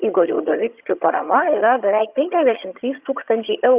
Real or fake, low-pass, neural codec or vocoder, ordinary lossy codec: fake; 3.6 kHz; vocoder, 22.05 kHz, 80 mel bands, HiFi-GAN; Opus, 64 kbps